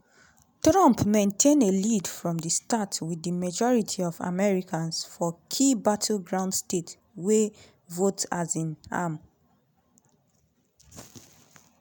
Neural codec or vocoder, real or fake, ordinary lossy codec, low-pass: none; real; none; none